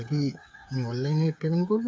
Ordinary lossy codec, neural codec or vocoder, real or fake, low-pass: none; codec, 16 kHz, 8 kbps, FreqCodec, smaller model; fake; none